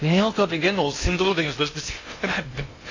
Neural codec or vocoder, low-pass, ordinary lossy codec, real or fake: codec, 16 kHz in and 24 kHz out, 0.6 kbps, FocalCodec, streaming, 2048 codes; 7.2 kHz; AAC, 32 kbps; fake